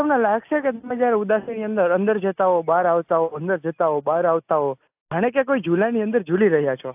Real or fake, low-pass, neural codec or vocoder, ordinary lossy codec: real; 3.6 kHz; none; AAC, 32 kbps